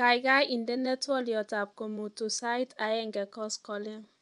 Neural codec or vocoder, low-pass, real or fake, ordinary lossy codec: vocoder, 24 kHz, 100 mel bands, Vocos; 10.8 kHz; fake; none